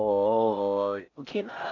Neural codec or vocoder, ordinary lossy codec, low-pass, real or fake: codec, 16 kHz in and 24 kHz out, 0.6 kbps, FocalCodec, streaming, 4096 codes; AAC, 48 kbps; 7.2 kHz; fake